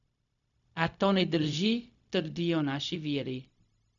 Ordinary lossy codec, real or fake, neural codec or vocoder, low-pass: none; fake; codec, 16 kHz, 0.4 kbps, LongCat-Audio-Codec; 7.2 kHz